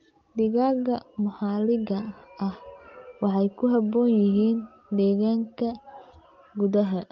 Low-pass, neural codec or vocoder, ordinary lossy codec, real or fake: 7.2 kHz; none; Opus, 24 kbps; real